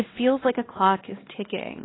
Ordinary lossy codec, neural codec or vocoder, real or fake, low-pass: AAC, 16 kbps; codec, 16 kHz, 2 kbps, X-Codec, WavLM features, trained on Multilingual LibriSpeech; fake; 7.2 kHz